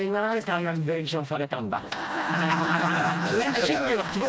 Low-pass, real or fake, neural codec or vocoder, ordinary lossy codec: none; fake; codec, 16 kHz, 1 kbps, FreqCodec, smaller model; none